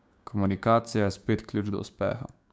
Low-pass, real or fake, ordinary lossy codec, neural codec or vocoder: none; fake; none; codec, 16 kHz, 6 kbps, DAC